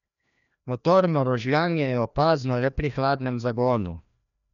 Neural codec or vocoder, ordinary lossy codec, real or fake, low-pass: codec, 16 kHz, 1 kbps, FreqCodec, larger model; none; fake; 7.2 kHz